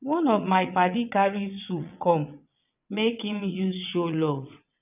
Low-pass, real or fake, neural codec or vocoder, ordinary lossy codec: 3.6 kHz; fake; vocoder, 22.05 kHz, 80 mel bands, WaveNeXt; none